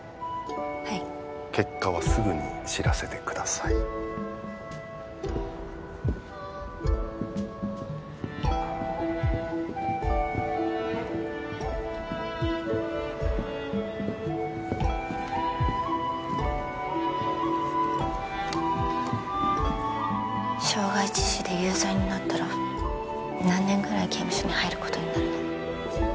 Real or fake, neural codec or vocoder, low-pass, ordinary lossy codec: real; none; none; none